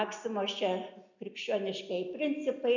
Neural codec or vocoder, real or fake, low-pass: none; real; 7.2 kHz